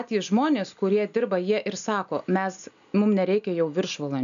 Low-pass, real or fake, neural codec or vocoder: 7.2 kHz; real; none